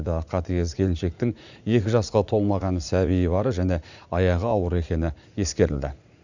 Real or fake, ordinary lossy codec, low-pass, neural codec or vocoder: fake; none; 7.2 kHz; vocoder, 44.1 kHz, 80 mel bands, Vocos